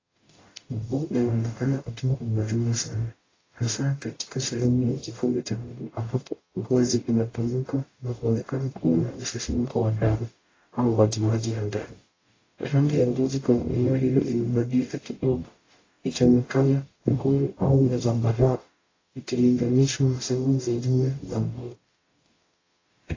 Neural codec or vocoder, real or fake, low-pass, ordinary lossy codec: codec, 44.1 kHz, 0.9 kbps, DAC; fake; 7.2 kHz; AAC, 32 kbps